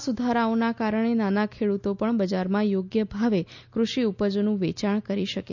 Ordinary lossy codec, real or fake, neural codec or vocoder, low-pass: none; real; none; 7.2 kHz